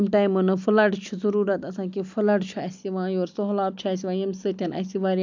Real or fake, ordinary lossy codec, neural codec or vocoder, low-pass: real; MP3, 64 kbps; none; 7.2 kHz